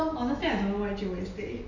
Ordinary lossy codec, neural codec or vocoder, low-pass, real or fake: none; none; 7.2 kHz; real